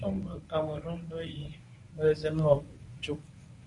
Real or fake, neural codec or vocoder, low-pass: fake; codec, 24 kHz, 0.9 kbps, WavTokenizer, medium speech release version 1; 10.8 kHz